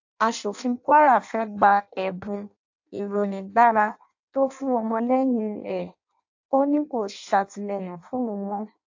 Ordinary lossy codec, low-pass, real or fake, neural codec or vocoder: AAC, 48 kbps; 7.2 kHz; fake; codec, 16 kHz in and 24 kHz out, 0.6 kbps, FireRedTTS-2 codec